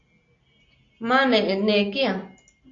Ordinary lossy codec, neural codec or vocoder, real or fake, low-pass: AAC, 48 kbps; none; real; 7.2 kHz